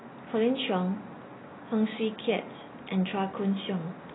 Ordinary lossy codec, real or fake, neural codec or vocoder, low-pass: AAC, 16 kbps; real; none; 7.2 kHz